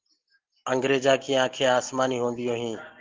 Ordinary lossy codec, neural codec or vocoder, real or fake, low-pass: Opus, 16 kbps; none; real; 7.2 kHz